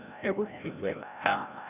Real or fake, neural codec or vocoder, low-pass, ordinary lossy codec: fake; codec, 16 kHz, 0.5 kbps, FreqCodec, larger model; 3.6 kHz; none